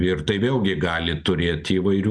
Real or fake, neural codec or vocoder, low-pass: real; none; 9.9 kHz